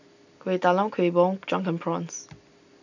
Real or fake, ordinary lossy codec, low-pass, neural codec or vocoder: real; none; 7.2 kHz; none